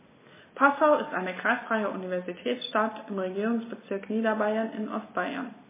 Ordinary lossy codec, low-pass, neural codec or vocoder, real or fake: MP3, 16 kbps; 3.6 kHz; none; real